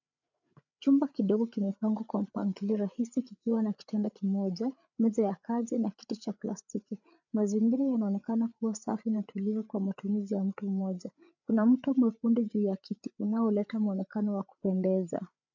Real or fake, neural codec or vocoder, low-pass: fake; codec, 16 kHz, 8 kbps, FreqCodec, larger model; 7.2 kHz